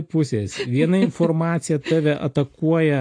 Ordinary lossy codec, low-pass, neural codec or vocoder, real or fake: AAC, 48 kbps; 9.9 kHz; none; real